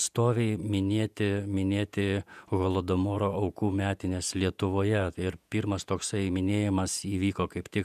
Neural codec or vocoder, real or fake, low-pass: vocoder, 48 kHz, 128 mel bands, Vocos; fake; 14.4 kHz